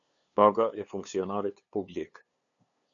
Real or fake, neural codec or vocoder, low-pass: fake; codec, 16 kHz, 2 kbps, FunCodec, trained on LibriTTS, 25 frames a second; 7.2 kHz